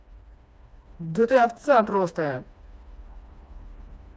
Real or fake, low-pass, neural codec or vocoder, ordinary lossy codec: fake; none; codec, 16 kHz, 2 kbps, FreqCodec, smaller model; none